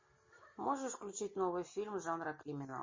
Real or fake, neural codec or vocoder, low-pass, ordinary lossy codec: real; none; 7.2 kHz; MP3, 32 kbps